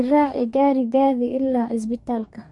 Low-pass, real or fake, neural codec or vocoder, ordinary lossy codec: 10.8 kHz; fake; codec, 44.1 kHz, 2.6 kbps, DAC; MP3, 48 kbps